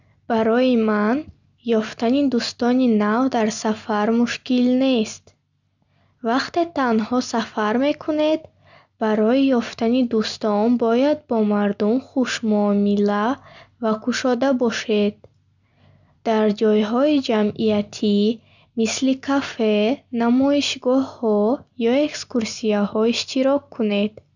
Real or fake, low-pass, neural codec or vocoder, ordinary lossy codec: real; 7.2 kHz; none; none